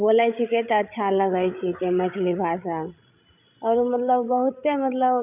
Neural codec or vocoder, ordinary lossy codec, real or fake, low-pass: codec, 16 kHz, 16 kbps, FreqCodec, larger model; none; fake; 3.6 kHz